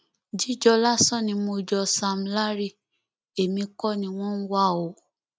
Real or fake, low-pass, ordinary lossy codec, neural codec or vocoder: real; none; none; none